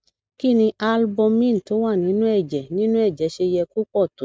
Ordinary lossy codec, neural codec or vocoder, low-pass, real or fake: none; none; none; real